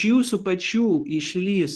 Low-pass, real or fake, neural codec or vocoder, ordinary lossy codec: 10.8 kHz; fake; codec, 24 kHz, 0.9 kbps, WavTokenizer, medium speech release version 2; Opus, 16 kbps